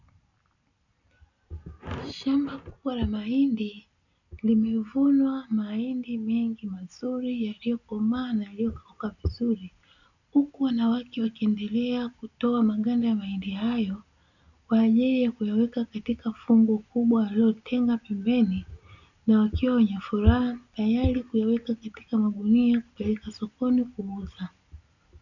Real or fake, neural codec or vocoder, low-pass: real; none; 7.2 kHz